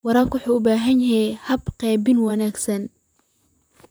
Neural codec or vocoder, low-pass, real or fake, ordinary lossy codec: vocoder, 44.1 kHz, 128 mel bands, Pupu-Vocoder; none; fake; none